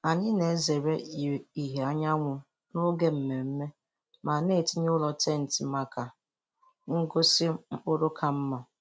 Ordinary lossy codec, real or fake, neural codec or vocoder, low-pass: none; real; none; none